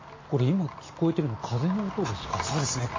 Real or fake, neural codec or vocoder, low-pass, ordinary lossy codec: real; none; 7.2 kHz; MP3, 32 kbps